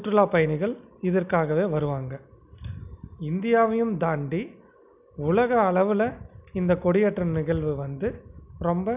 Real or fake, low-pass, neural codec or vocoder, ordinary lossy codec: real; 3.6 kHz; none; none